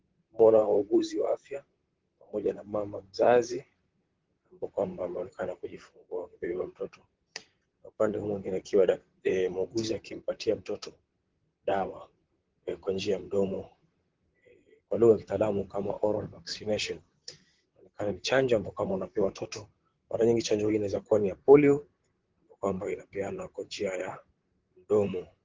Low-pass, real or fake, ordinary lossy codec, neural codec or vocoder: 7.2 kHz; fake; Opus, 16 kbps; vocoder, 44.1 kHz, 128 mel bands, Pupu-Vocoder